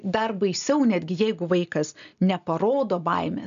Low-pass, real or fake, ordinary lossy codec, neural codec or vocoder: 7.2 kHz; real; MP3, 96 kbps; none